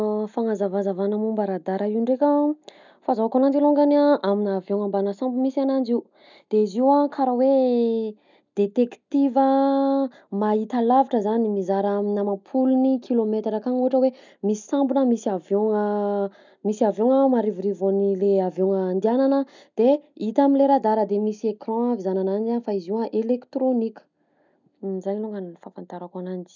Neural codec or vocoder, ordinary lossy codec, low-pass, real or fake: none; none; 7.2 kHz; real